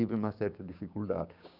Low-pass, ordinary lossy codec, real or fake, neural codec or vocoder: 5.4 kHz; none; fake; codec, 16 kHz, 6 kbps, DAC